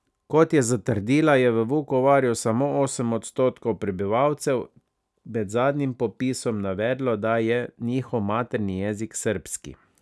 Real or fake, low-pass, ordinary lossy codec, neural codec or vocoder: real; none; none; none